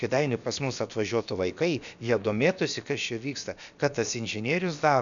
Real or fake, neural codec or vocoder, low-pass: fake; codec, 16 kHz, about 1 kbps, DyCAST, with the encoder's durations; 7.2 kHz